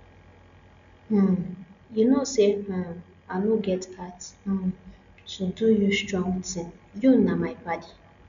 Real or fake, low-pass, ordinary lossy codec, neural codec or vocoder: real; 7.2 kHz; none; none